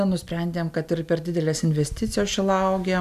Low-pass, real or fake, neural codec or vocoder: 14.4 kHz; real; none